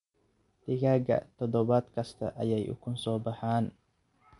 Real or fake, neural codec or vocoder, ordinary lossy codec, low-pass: real; none; MP3, 64 kbps; 10.8 kHz